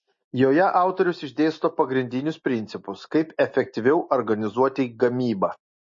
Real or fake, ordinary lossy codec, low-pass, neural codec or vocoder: real; MP3, 32 kbps; 7.2 kHz; none